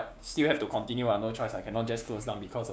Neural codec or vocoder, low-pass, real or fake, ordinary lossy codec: codec, 16 kHz, 6 kbps, DAC; none; fake; none